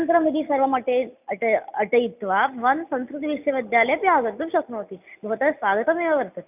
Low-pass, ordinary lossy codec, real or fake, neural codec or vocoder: 3.6 kHz; none; real; none